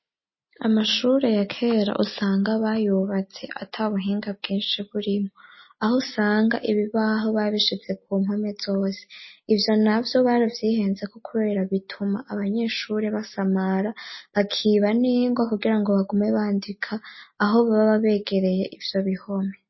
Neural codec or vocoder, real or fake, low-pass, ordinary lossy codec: none; real; 7.2 kHz; MP3, 24 kbps